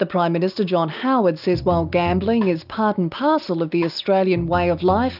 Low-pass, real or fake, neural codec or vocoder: 5.4 kHz; real; none